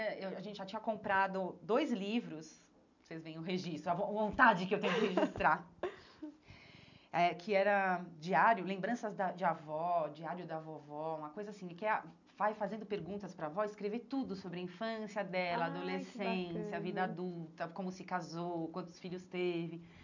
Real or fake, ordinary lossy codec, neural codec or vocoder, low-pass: real; none; none; 7.2 kHz